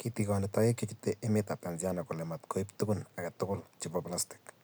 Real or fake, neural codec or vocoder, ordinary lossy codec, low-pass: real; none; none; none